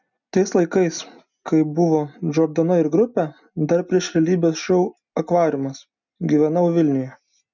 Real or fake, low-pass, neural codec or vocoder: real; 7.2 kHz; none